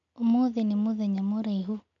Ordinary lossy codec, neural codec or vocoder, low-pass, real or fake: none; none; 7.2 kHz; real